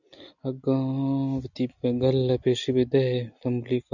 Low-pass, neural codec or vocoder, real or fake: 7.2 kHz; none; real